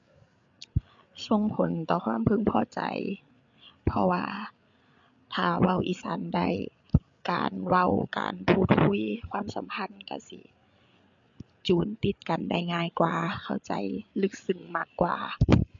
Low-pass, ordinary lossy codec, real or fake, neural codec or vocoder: 7.2 kHz; MP3, 64 kbps; fake; codec, 16 kHz, 8 kbps, FreqCodec, larger model